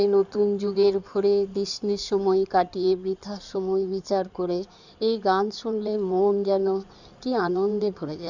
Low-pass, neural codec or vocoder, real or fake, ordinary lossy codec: 7.2 kHz; codec, 16 kHz in and 24 kHz out, 2.2 kbps, FireRedTTS-2 codec; fake; none